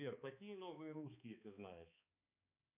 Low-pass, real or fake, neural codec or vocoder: 3.6 kHz; fake; codec, 16 kHz, 2 kbps, X-Codec, HuBERT features, trained on balanced general audio